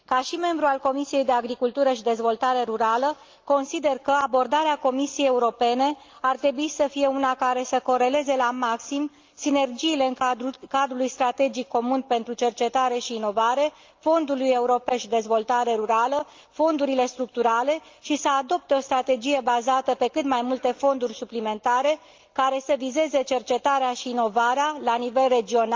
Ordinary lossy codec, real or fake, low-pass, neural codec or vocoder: Opus, 24 kbps; real; 7.2 kHz; none